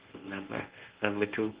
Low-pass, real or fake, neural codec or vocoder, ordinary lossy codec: 3.6 kHz; fake; codec, 24 kHz, 0.9 kbps, WavTokenizer, medium speech release version 1; Opus, 16 kbps